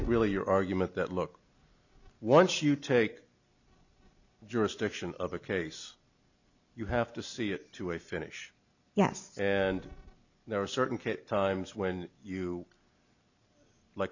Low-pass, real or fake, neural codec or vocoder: 7.2 kHz; real; none